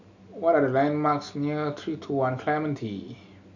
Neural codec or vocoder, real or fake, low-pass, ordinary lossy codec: none; real; 7.2 kHz; none